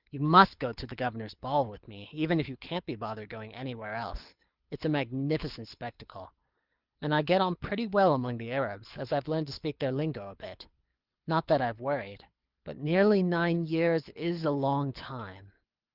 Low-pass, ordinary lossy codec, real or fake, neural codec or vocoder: 5.4 kHz; Opus, 24 kbps; fake; codec, 24 kHz, 6 kbps, HILCodec